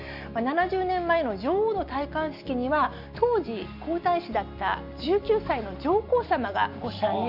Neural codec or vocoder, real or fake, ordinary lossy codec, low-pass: none; real; none; 5.4 kHz